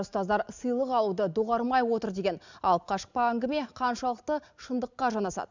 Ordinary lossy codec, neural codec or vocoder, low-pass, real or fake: none; none; 7.2 kHz; real